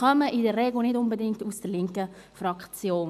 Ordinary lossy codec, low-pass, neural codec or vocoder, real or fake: none; 14.4 kHz; none; real